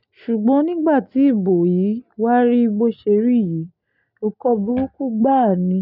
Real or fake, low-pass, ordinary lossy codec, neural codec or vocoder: real; 5.4 kHz; none; none